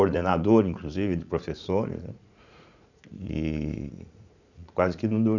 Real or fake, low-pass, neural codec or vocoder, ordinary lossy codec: fake; 7.2 kHz; vocoder, 44.1 kHz, 80 mel bands, Vocos; none